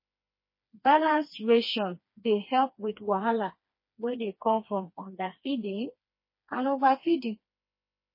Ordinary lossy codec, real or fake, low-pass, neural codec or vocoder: MP3, 24 kbps; fake; 5.4 kHz; codec, 16 kHz, 2 kbps, FreqCodec, smaller model